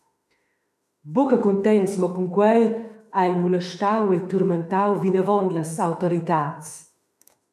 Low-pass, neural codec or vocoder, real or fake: 14.4 kHz; autoencoder, 48 kHz, 32 numbers a frame, DAC-VAE, trained on Japanese speech; fake